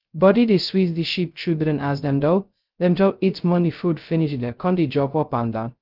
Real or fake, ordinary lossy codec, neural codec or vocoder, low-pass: fake; Opus, 24 kbps; codec, 16 kHz, 0.2 kbps, FocalCodec; 5.4 kHz